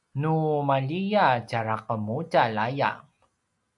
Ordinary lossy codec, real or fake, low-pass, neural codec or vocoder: MP3, 64 kbps; real; 10.8 kHz; none